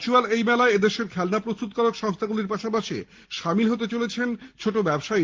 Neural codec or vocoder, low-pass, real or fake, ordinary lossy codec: none; 7.2 kHz; real; Opus, 16 kbps